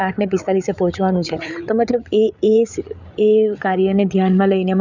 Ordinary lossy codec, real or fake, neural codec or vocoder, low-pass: none; fake; codec, 16 kHz, 8 kbps, FreqCodec, larger model; 7.2 kHz